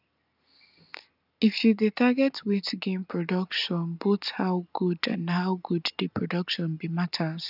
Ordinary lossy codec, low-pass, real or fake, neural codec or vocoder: none; 5.4 kHz; real; none